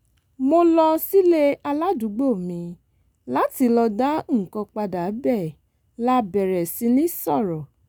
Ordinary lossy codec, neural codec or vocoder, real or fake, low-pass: none; none; real; none